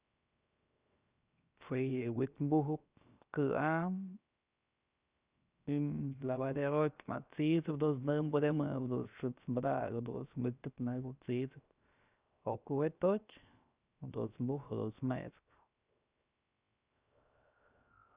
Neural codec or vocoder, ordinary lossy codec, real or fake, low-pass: codec, 16 kHz, 0.7 kbps, FocalCodec; Opus, 64 kbps; fake; 3.6 kHz